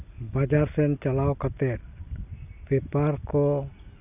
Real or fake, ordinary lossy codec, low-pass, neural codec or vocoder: real; none; 3.6 kHz; none